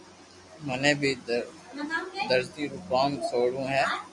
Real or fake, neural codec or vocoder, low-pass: real; none; 10.8 kHz